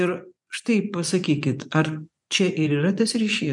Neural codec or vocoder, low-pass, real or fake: vocoder, 24 kHz, 100 mel bands, Vocos; 10.8 kHz; fake